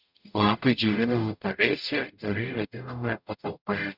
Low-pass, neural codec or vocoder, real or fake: 5.4 kHz; codec, 44.1 kHz, 0.9 kbps, DAC; fake